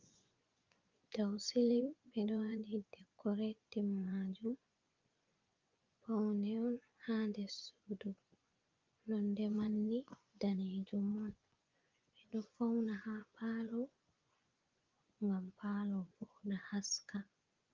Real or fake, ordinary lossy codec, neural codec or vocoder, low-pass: fake; Opus, 24 kbps; vocoder, 24 kHz, 100 mel bands, Vocos; 7.2 kHz